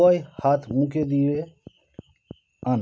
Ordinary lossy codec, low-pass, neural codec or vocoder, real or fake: none; none; none; real